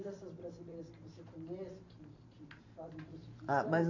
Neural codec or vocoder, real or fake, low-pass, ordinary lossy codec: vocoder, 44.1 kHz, 128 mel bands every 512 samples, BigVGAN v2; fake; 7.2 kHz; none